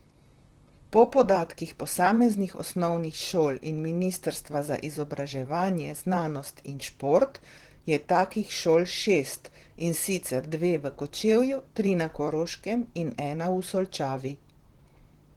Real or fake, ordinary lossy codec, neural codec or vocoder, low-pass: fake; Opus, 16 kbps; vocoder, 44.1 kHz, 128 mel bands, Pupu-Vocoder; 19.8 kHz